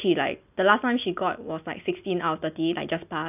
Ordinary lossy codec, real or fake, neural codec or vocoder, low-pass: none; real; none; 3.6 kHz